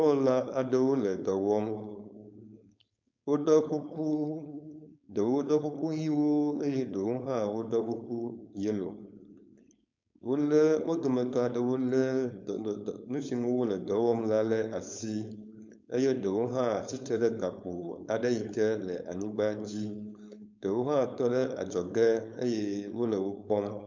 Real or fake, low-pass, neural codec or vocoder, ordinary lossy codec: fake; 7.2 kHz; codec, 16 kHz, 4.8 kbps, FACodec; MP3, 64 kbps